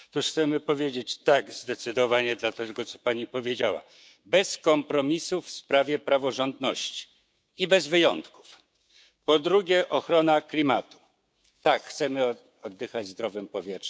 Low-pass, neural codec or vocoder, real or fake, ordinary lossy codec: none; codec, 16 kHz, 6 kbps, DAC; fake; none